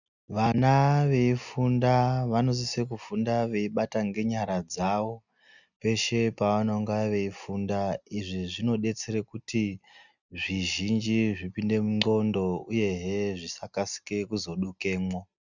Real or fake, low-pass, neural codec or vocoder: real; 7.2 kHz; none